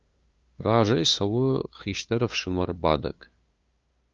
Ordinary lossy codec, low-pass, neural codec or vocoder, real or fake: Opus, 24 kbps; 7.2 kHz; codec, 16 kHz, 2 kbps, FunCodec, trained on LibriTTS, 25 frames a second; fake